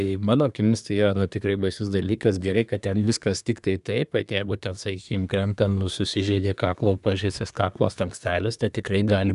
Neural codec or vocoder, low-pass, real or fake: codec, 24 kHz, 1 kbps, SNAC; 10.8 kHz; fake